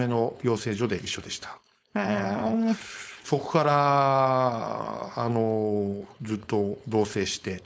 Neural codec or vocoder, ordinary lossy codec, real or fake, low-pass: codec, 16 kHz, 4.8 kbps, FACodec; none; fake; none